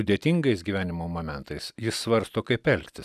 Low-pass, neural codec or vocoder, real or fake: 14.4 kHz; none; real